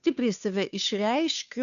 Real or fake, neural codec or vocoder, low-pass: fake; codec, 16 kHz, 2 kbps, FunCodec, trained on Chinese and English, 25 frames a second; 7.2 kHz